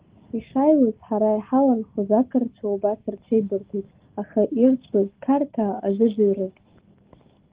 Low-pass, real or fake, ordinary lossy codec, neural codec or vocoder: 3.6 kHz; real; Opus, 16 kbps; none